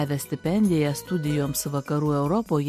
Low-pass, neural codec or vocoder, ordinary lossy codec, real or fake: 14.4 kHz; none; MP3, 64 kbps; real